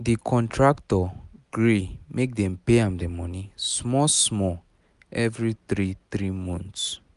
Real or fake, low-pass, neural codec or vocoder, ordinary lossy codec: real; 10.8 kHz; none; none